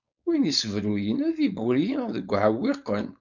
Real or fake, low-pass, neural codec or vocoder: fake; 7.2 kHz; codec, 16 kHz, 4.8 kbps, FACodec